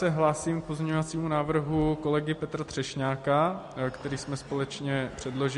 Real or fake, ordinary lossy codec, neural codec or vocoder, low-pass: real; MP3, 48 kbps; none; 14.4 kHz